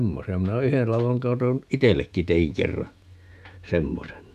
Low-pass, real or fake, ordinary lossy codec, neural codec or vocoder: 14.4 kHz; fake; none; autoencoder, 48 kHz, 128 numbers a frame, DAC-VAE, trained on Japanese speech